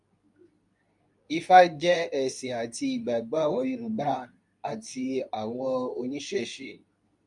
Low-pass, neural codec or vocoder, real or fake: 10.8 kHz; codec, 24 kHz, 0.9 kbps, WavTokenizer, medium speech release version 2; fake